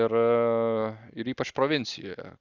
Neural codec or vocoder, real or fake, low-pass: none; real; 7.2 kHz